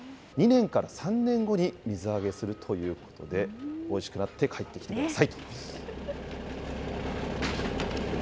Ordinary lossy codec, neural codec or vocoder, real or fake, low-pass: none; none; real; none